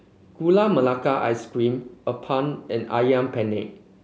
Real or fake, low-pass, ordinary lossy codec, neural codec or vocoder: real; none; none; none